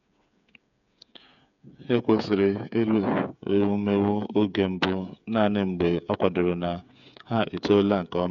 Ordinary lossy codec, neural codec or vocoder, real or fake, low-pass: none; codec, 16 kHz, 8 kbps, FreqCodec, smaller model; fake; 7.2 kHz